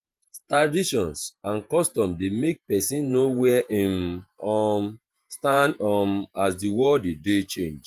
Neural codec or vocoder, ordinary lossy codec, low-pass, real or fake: vocoder, 48 kHz, 128 mel bands, Vocos; Opus, 32 kbps; 14.4 kHz; fake